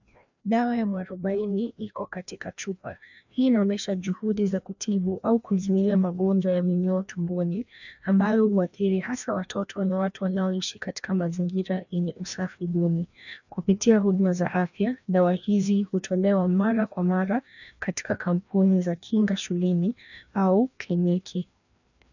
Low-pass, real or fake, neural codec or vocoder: 7.2 kHz; fake; codec, 16 kHz, 1 kbps, FreqCodec, larger model